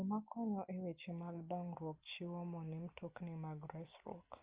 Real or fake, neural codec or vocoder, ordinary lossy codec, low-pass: real; none; Opus, 24 kbps; 3.6 kHz